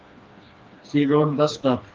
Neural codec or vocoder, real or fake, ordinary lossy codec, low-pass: codec, 16 kHz, 2 kbps, FreqCodec, smaller model; fake; Opus, 24 kbps; 7.2 kHz